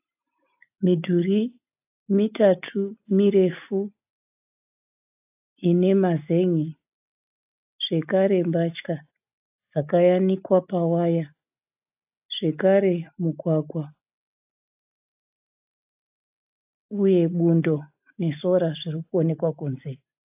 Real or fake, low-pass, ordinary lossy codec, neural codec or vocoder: real; 3.6 kHz; AAC, 32 kbps; none